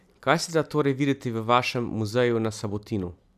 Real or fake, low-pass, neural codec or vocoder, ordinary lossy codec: real; 14.4 kHz; none; none